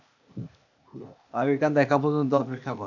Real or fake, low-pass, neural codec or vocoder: fake; 7.2 kHz; codec, 16 kHz, 0.8 kbps, ZipCodec